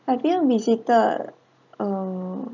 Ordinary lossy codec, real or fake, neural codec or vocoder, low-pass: none; real; none; 7.2 kHz